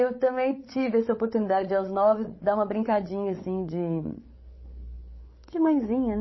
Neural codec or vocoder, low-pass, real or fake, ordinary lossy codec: codec, 16 kHz, 8 kbps, FreqCodec, larger model; 7.2 kHz; fake; MP3, 24 kbps